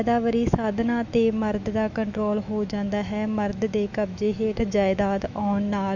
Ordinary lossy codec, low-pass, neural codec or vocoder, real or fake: none; 7.2 kHz; autoencoder, 48 kHz, 128 numbers a frame, DAC-VAE, trained on Japanese speech; fake